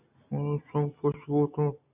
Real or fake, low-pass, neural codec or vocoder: real; 3.6 kHz; none